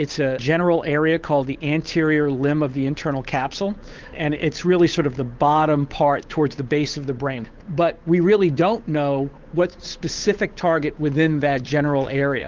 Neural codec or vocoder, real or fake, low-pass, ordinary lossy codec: codec, 16 kHz, 8 kbps, FunCodec, trained on Chinese and English, 25 frames a second; fake; 7.2 kHz; Opus, 32 kbps